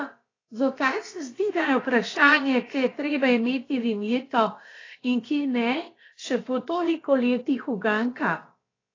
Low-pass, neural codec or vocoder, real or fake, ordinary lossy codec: 7.2 kHz; codec, 16 kHz, about 1 kbps, DyCAST, with the encoder's durations; fake; AAC, 32 kbps